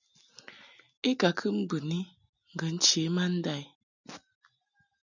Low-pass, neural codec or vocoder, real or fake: 7.2 kHz; none; real